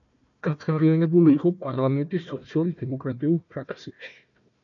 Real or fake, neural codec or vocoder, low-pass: fake; codec, 16 kHz, 1 kbps, FunCodec, trained on Chinese and English, 50 frames a second; 7.2 kHz